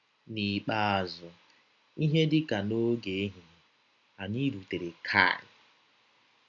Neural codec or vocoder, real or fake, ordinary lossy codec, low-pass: none; real; none; 7.2 kHz